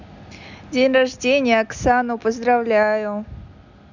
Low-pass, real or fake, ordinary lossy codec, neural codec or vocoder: 7.2 kHz; real; none; none